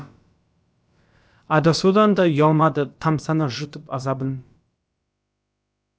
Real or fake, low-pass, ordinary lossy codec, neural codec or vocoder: fake; none; none; codec, 16 kHz, about 1 kbps, DyCAST, with the encoder's durations